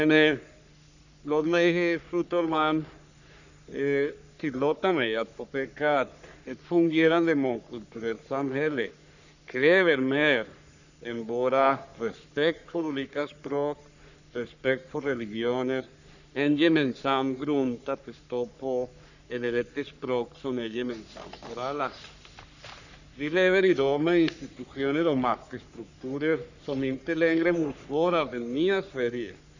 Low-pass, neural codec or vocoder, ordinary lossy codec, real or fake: 7.2 kHz; codec, 44.1 kHz, 3.4 kbps, Pupu-Codec; none; fake